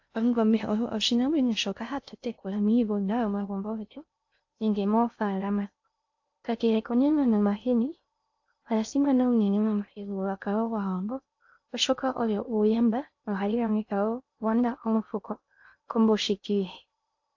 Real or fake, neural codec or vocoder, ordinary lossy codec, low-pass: fake; codec, 16 kHz in and 24 kHz out, 0.6 kbps, FocalCodec, streaming, 2048 codes; AAC, 48 kbps; 7.2 kHz